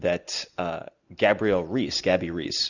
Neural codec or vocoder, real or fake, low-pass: none; real; 7.2 kHz